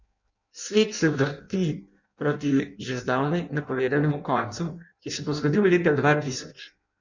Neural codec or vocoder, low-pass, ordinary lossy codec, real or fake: codec, 16 kHz in and 24 kHz out, 0.6 kbps, FireRedTTS-2 codec; 7.2 kHz; none; fake